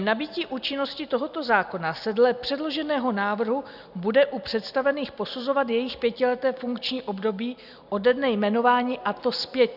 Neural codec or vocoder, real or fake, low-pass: none; real; 5.4 kHz